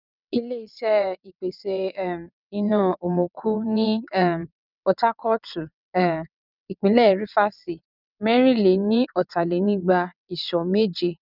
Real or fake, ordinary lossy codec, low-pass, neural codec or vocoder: fake; none; 5.4 kHz; vocoder, 22.05 kHz, 80 mel bands, WaveNeXt